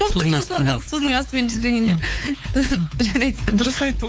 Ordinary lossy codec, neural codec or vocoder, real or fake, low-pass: none; codec, 16 kHz, 4 kbps, X-Codec, WavLM features, trained on Multilingual LibriSpeech; fake; none